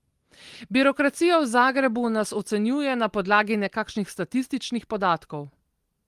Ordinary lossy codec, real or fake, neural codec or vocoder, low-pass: Opus, 24 kbps; real; none; 14.4 kHz